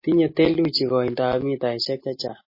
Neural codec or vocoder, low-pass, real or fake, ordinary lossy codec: none; 5.4 kHz; real; MP3, 24 kbps